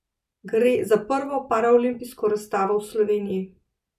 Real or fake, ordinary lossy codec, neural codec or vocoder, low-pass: real; none; none; 14.4 kHz